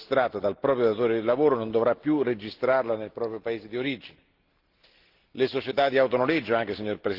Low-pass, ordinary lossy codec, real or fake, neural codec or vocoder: 5.4 kHz; Opus, 16 kbps; real; none